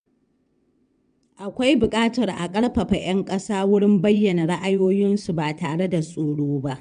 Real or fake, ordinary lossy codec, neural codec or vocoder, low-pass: fake; none; vocoder, 22.05 kHz, 80 mel bands, WaveNeXt; 9.9 kHz